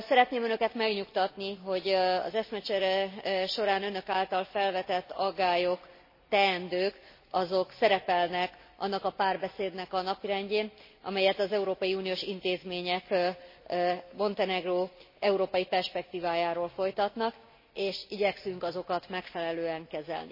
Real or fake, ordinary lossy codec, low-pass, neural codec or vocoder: real; MP3, 24 kbps; 5.4 kHz; none